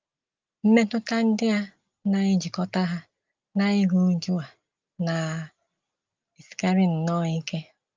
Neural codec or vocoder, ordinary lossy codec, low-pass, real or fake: none; Opus, 24 kbps; 7.2 kHz; real